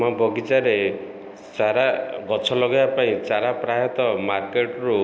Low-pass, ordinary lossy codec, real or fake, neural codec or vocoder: none; none; real; none